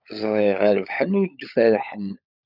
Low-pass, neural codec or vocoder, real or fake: 5.4 kHz; codec, 16 kHz, 16 kbps, FunCodec, trained on LibriTTS, 50 frames a second; fake